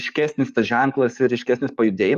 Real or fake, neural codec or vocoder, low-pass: fake; codec, 44.1 kHz, 7.8 kbps, DAC; 14.4 kHz